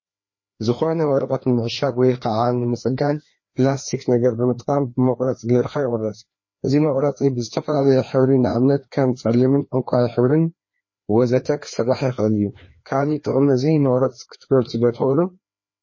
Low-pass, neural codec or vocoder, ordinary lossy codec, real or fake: 7.2 kHz; codec, 16 kHz, 2 kbps, FreqCodec, larger model; MP3, 32 kbps; fake